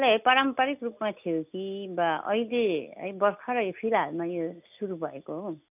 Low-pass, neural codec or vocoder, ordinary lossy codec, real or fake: 3.6 kHz; none; none; real